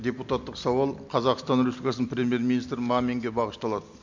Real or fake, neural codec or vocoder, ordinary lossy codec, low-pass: real; none; MP3, 64 kbps; 7.2 kHz